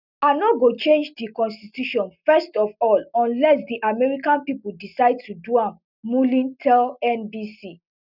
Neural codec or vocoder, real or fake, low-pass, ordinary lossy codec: none; real; 5.4 kHz; none